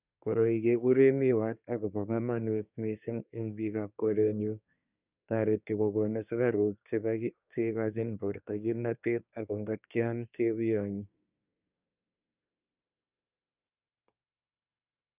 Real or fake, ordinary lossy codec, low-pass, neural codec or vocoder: fake; none; 3.6 kHz; codec, 24 kHz, 1 kbps, SNAC